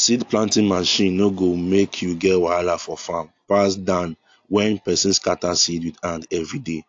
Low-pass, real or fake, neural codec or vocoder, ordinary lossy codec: 7.2 kHz; real; none; AAC, 48 kbps